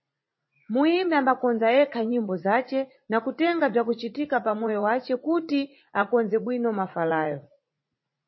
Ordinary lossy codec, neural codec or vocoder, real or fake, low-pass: MP3, 24 kbps; vocoder, 44.1 kHz, 80 mel bands, Vocos; fake; 7.2 kHz